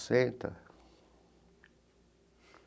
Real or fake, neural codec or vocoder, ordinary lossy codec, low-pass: real; none; none; none